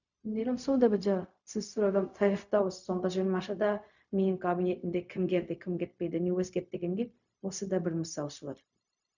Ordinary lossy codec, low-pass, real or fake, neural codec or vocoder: none; 7.2 kHz; fake; codec, 16 kHz, 0.4 kbps, LongCat-Audio-Codec